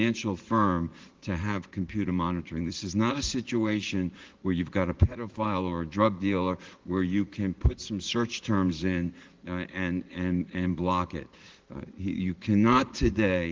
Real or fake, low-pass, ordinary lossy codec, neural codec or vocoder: fake; 7.2 kHz; Opus, 16 kbps; vocoder, 44.1 kHz, 80 mel bands, Vocos